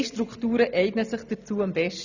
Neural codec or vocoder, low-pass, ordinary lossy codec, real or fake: none; 7.2 kHz; none; real